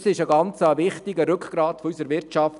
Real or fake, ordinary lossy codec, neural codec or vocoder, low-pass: real; none; none; 10.8 kHz